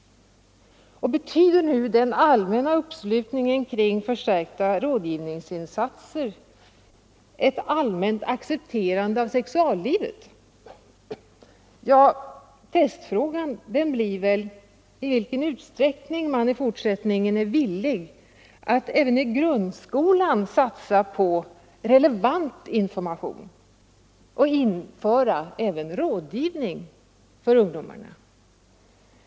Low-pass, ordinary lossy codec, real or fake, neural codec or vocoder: none; none; real; none